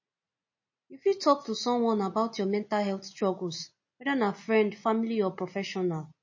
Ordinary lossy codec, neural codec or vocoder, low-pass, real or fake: MP3, 32 kbps; none; 7.2 kHz; real